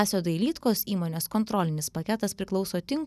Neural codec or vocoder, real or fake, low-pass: none; real; 14.4 kHz